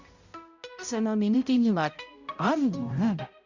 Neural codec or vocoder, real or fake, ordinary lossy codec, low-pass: codec, 16 kHz, 0.5 kbps, X-Codec, HuBERT features, trained on balanced general audio; fake; Opus, 64 kbps; 7.2 kHz